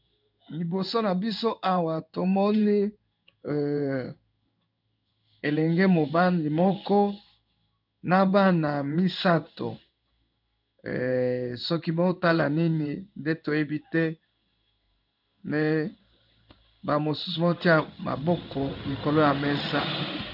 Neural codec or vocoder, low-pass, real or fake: codec, 16 kHz in and 24 kHz out, 1 kbps, XY-Tokenizer; 5.4 kHz; fake